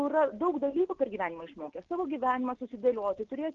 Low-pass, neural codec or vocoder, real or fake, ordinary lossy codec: 7.2 kHz; none; real; Opus, 16 kbps